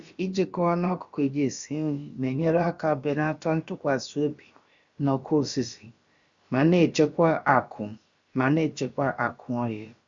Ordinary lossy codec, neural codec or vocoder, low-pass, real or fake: Opus, 64 kbps; codec, 16 kHz, about 1 kbps, DyCAST, with the encoder's durations; 7.2 kHz; fake